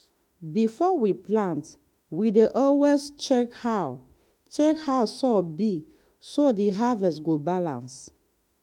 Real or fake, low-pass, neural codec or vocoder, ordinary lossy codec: fake; 19.8 kHz; autoencoder, 48 kHz, 32 numbers a frame, DAC-VAE, trained on Japanese speech; MP3, 96 kbps